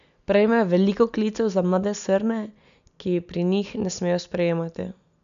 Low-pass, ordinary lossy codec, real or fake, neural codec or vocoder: 7.2 kHz; none; real; none